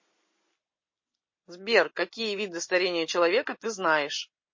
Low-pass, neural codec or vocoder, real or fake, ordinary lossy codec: 7.2 kHz; none; real; MP3, 32 kbps